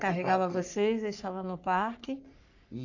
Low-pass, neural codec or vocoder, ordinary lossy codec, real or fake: 7.2 kHz; codec, 44.1 kHz, 3.4 kbps, Pupu-Codec; none; fake